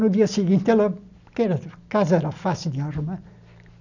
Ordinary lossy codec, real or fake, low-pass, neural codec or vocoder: none; real; 7.2 kHz; none